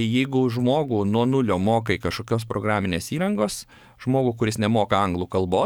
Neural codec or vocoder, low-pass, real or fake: codec, 44.1 kHz, 7.8 kbps, DAC; 19.8 kHz; fake